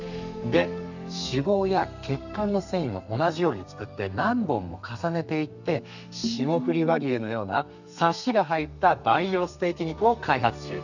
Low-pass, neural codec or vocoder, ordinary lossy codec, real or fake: 7.2 kHz; codec, 32 kHz, 1.9 kbps, SNAC; none; fake